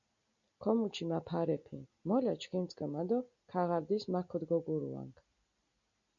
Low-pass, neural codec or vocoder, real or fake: 7.2 kHz; none; real